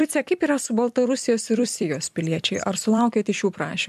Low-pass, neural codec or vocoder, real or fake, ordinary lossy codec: 14.4 kHz; vocoder, 44.1 kHz, 128 mel bands every 256 samples, BigVGAN v2; fake; MP3, 96 kbps